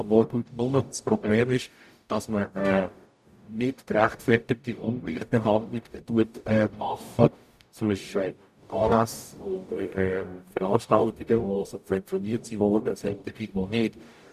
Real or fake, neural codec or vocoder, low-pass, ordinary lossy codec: fake; codec, 44.1 kHz, 0.9 kbps, DAC; 14.4 kHz; none